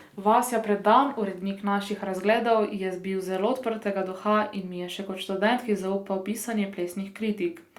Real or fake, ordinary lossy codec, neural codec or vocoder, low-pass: real; Opus, 64 kbps; none; 19.8 kHz